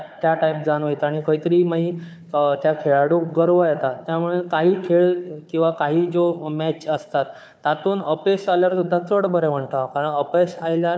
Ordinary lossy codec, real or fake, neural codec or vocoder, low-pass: none; fake; codec, 16 kHz, 4 kbps, FunCodec, trained on Chinese and English, 50 frames a second; none